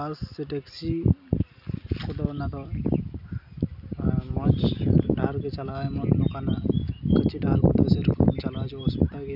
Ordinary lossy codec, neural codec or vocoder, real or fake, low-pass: none; none; real; 5.4 kHz